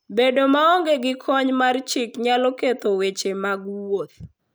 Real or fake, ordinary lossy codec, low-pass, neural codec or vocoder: real; none; none; none